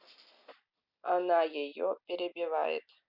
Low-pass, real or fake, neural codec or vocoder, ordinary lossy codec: 5.4 kHz; real; none; none